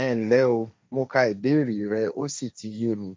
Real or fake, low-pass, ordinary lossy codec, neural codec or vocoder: fake; none; none; codec, 16 kHz, 1.1 kbps, Voila-Tokenizer